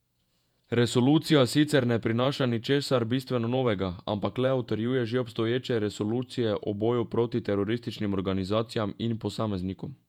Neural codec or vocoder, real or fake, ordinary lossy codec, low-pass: vocoder, 48 kHz, 128 mel bands, Vocos; fake; none; 19.8 kHz